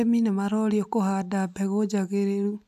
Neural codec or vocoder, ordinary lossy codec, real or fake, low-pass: none; AAC, 96 kbps; real; 14.4 kHz